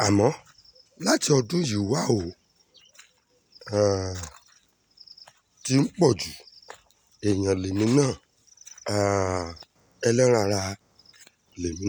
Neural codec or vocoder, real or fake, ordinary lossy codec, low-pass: none; real; none; none